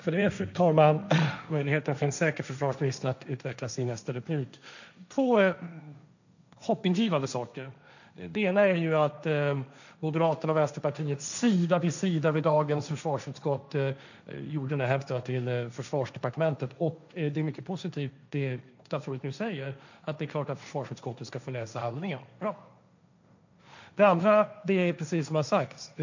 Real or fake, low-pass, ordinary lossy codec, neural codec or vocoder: fake; 7.2 kHz; none; codec, 16 kHz, 1.1 kbps, Voila-Tokenizer